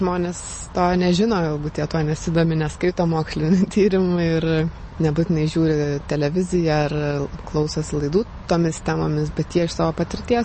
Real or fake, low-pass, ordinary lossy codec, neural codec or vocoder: real; 10.8 kHz; MP3, 32 kbps; none